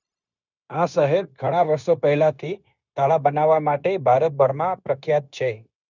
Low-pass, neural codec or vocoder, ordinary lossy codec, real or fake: 7.2 kHz; codec, 16 kHz, 0.9 kbps, LongCat-Audio-Codec; none; fake